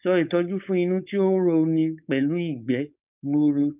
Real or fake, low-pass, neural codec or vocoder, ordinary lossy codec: fake; 3.6 kHz; codec, 16 kHz, 4.8 kbps, FACodec; none